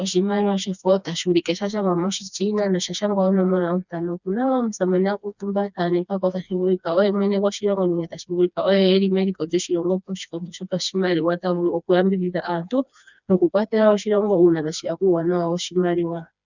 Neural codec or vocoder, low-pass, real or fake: codec, 16 kHz, 2 kbps, FreqCodec, smaller model; 7.2 kHz; fake